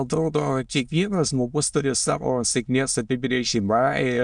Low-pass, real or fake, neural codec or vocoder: 9.9 kHz; fake; autoencoder, 22.05 kHz, a latent of 192 numbers a frame, VITS, trained on many speakers